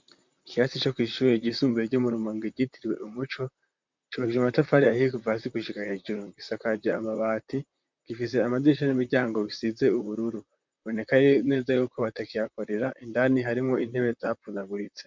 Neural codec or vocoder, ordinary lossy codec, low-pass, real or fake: vocoder, 44.1 kHz, 128 mel bands, Pupu-Vocoder; MP3, 64 kbps; 7.2 kHz; fake